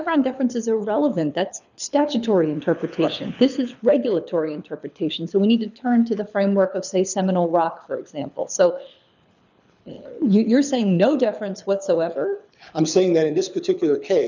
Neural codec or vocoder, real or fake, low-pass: codec, 24 kHz, 6 kbps, HILCodec; fake; 7.2 kHz